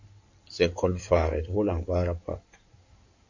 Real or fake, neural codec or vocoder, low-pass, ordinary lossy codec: fake; codec, 16 kHz in and 24 kHz out, 2.2 kbps, FireRedTTS-2 codec; 7.2 kHz; AAC, 48 kbps